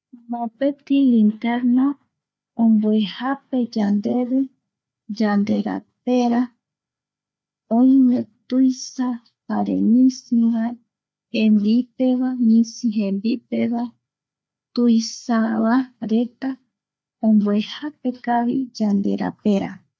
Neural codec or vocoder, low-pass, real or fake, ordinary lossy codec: codec, 16 kHz, 4 kbps, FreqCodec, larger model; none; fake; none